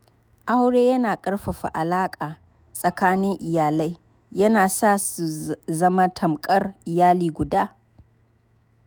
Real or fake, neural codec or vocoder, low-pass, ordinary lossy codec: fake; autoencoder, 48 kHz, 128 numbers a frame, DAC-VAE, trained on Japanese speech; none; none